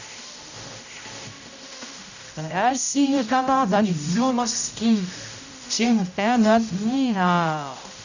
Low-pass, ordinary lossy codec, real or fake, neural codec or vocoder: 7.2 kHz; none; fake; codec, 16 kHz, 0.5 kbps, X-Codec, HuBERT features, trained on general audio